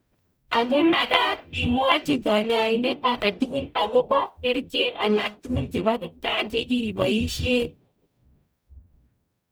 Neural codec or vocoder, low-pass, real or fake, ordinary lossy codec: codec, 44.1 kHz, 0.9 kbps, DAC; none; fake; none